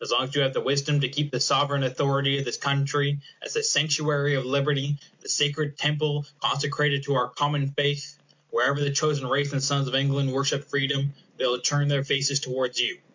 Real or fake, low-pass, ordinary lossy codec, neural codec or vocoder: real; 7.2 kHz; MP3, 64 kbps; none